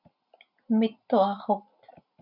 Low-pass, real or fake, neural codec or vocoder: 5.4 kHz; real; none